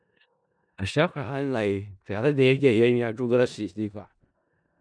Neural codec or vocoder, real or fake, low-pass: codec, 16 kHz in and 24 kHz out, 0.4 kbps, LongCat-Audio-Codec, four codebook decoder; fake; 9.9 kHz